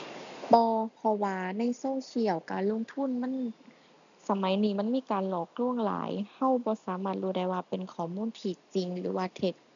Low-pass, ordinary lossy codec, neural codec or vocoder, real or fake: 7.2 kHz; none; none; real